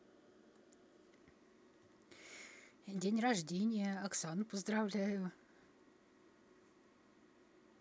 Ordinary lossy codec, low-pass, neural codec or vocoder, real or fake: none; none; none; real